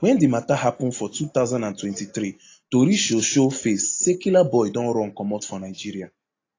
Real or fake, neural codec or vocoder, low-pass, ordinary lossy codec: real; none; 7.2 kHz; AAC, 32 kbps